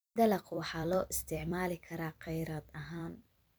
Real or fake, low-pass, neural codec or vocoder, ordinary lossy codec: fake; none; vocoder, 44.1 kHz, 128 mel bands every 256 samples, BigVGAN v2; none